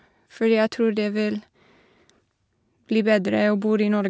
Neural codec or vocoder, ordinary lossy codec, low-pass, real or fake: none; none; none; real